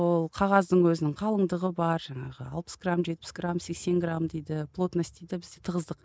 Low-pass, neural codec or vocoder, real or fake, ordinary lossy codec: none; none; real; none